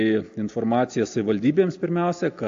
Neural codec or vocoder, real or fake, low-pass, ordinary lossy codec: none; real; 7.2 kHz; AAC, 48 kbps